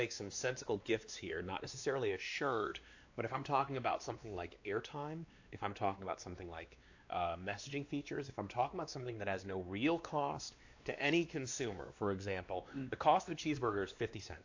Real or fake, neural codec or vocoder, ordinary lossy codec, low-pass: fake; codec, 16 kHz, 2 kbps, X-Codec, WavLM features, trained on Multilingual LibriSpeech; AAC, 48 kbps; 7.2 kHz